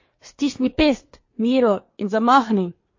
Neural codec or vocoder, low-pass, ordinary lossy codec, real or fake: codec, 24 kHz, 1 kbps, SNAC; 7.2 kHz; MP3, 32 kbps; fake